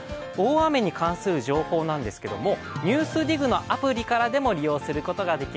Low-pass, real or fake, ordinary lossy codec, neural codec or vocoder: none; real; none; none